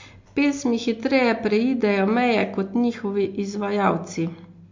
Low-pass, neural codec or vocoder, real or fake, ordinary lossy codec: 7.2 kHz; none; real; MP3, 48 kbps